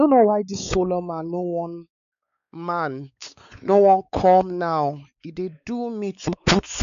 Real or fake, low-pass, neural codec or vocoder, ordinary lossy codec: fake; 7.2 kHz; codec, 16 kHz, 4 kbps, X-Codec, WavLM features, trained on Multilingual LibriSpeech; none